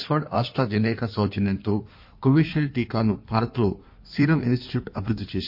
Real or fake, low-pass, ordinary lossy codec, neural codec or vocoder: fake; 5.4 kHz; MP3, 32 kbps; codec, 16 kHz in and 24 kHz out, 1.1 kbps, FireRedTTS-2 codec